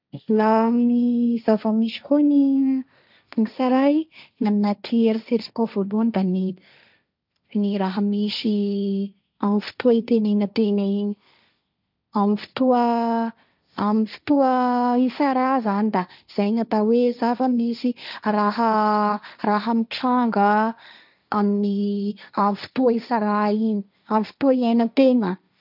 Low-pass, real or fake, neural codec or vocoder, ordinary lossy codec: 5.4 kHz; fake; codec, 16 kHz, 1.1 kbps, Voila-Tokenizer; none